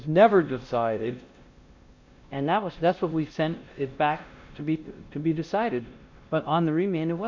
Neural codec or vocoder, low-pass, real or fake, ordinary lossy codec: codec, 16 kHz, 1 kbps, X-Codec, WavLM features, trained on Multilingual LibriSpeech; 7.2 kHz; fake; Opus, 64 kbps